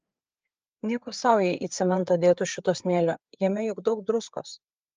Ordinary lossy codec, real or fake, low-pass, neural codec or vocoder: Opus, 24 kbps; fake; 7.2 kHz; codec, 16 kHz, 4 kbps, FreqCodec, larger model